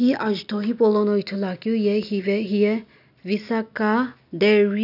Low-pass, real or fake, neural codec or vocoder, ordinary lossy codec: 5.4 kHz; real; none; none